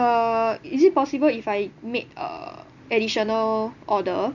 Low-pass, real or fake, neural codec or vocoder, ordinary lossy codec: 7.2 kHz; real; none; none